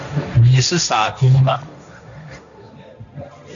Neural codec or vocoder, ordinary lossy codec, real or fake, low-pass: codec, 16 kHz, 1.1 kbps, Voila-Tokenizer; MP3, 96 kbps; fake; 7.2 kHz